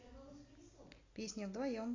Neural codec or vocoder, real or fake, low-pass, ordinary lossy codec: none; real; 7.2 kHz; AAC, 32 kbps